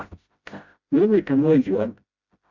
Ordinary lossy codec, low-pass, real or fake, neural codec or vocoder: Opus, 64 kbps; 7.2 kHz; fake; codec, 16 kHz, 0.5 kbps, FreqCodec, smaller model